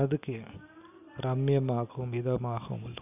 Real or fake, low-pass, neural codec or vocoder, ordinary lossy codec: real; 3.6 kHz; none; none